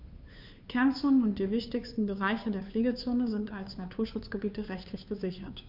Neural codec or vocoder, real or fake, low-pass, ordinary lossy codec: codec, 16 kHz, 2 kbps, FunCodec, trained on Chinese and English, 25 frames a second; fake; 5.4 kHz; none